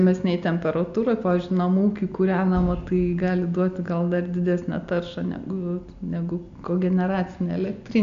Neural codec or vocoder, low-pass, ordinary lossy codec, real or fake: none; 7.2 kHz; AAC, 64 kbps; real